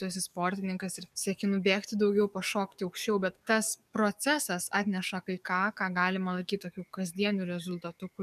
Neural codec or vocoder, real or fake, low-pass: codec, 44.1 kHz, 7.8 kbps, DAC; fake; 14.4 kHz